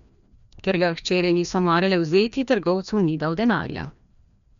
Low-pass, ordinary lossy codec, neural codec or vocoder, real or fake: 7.2 kHz; none; codec, 16 kHz, 1 kbps, FreqCodec, larger model; fake